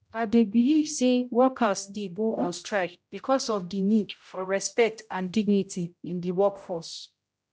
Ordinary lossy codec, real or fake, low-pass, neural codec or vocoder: none; fake; none; codec, 16 kHz, 0.5 kbps, X-Codec, HuBERT features, trained on general audio